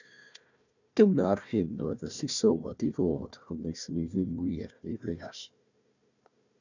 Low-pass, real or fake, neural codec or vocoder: 7.2 kHz; fake; codec, 16 kHz, 1 kbps, FunCodec, trained on Chinese and English, 50 frames a second